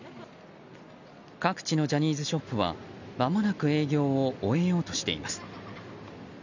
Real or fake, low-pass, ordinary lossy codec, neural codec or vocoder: real; 7.2 kHz; none; none